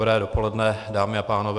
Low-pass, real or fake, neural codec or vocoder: 10.8 kHz; real; none